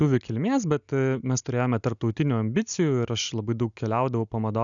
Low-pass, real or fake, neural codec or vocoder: 7.2 kHz; real; none